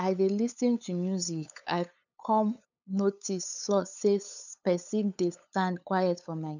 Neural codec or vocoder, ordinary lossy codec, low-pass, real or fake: codec, 16 kHz, 8 kbps, FunCodec, trained on LibriTTS, 25 frames a second; none; 7.2 kHz; fake